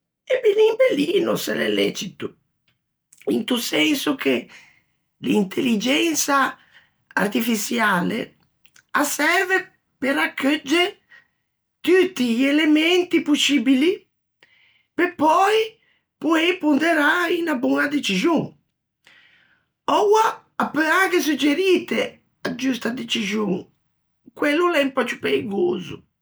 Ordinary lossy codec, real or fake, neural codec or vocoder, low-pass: none; real; none; none